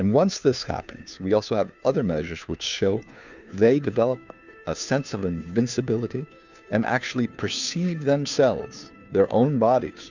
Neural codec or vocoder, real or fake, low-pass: codec, 16 kHz, 2 kbps, FunCodec, trained on Chinese and English, 25 frames a second; fake; 7.2 kHz